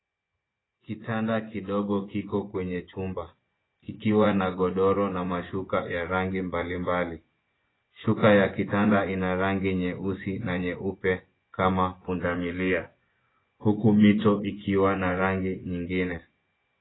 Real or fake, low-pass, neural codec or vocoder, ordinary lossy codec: fake; 7.2 kHz; vocoder, 24 kHz, 100 mel bands, Vocos; AAC, 16 kbps